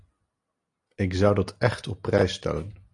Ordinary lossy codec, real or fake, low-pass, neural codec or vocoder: AAC, 48 kbps; real; 10.8 kHz; none